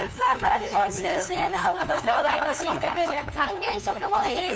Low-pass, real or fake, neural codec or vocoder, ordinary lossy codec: none; fake; codec, 16 kHz, 2 kbps, FunCodec, trained on LibriTTS, 25 frames a second; none